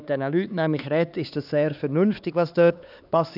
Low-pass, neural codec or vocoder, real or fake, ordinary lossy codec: 5.4 kHz; codec, 16 kHz, 4 kbps, X-Codec, HuBERT features, trained on LibriSpeech; fake; none